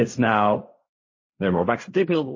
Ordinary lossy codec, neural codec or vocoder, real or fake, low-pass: MP3, 32 kbps; codec, 16 kHz in and 24 kHz out, 0.4 kbps, LongCat-Audio-Codec, fine tuned four codebook decoder; fake; 7.2 kHz